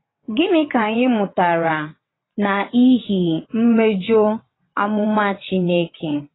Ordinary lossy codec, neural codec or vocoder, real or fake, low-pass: AAC, 16 kbps; vocoder, 22.05 kHz, 80 mel bands, WaveNeXt; fake; 7.2 kHz